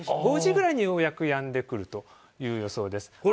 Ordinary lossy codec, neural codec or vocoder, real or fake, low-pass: none; none; real; none